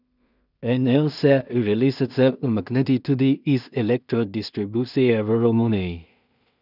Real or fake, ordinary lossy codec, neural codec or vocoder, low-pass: fake; none; codec, 16 kHz in and 24 kHz out, 0.4 kbps, LongCat-Audio-Codec, two codebook decoder; 5.4 kHz